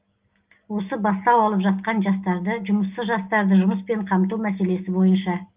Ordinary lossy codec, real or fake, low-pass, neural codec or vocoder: Opus, 32 kbps; real; 3.6 kHz; none